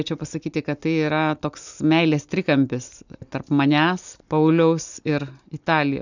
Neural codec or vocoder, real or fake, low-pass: none; real; 7.2 kHz